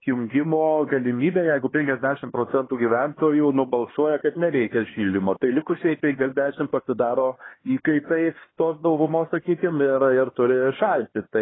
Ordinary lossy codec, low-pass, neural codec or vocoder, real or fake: AAC, 16 kbps; 7.2 kHz; codec, 16 kHz, 2 kbps, X-Codec, HuBERT features, trained on LibriSpeech; fake